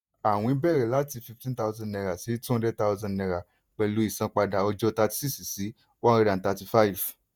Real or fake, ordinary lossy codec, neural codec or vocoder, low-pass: fake; none; vocoder, 48 kHz, 128 mel bands, Vocos; none